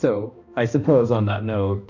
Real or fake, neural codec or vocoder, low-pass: fake; codec, 16 kHz, 1 kbps, X-Codec, HuBERT features, trained on balanced general audio; 7.2 kHz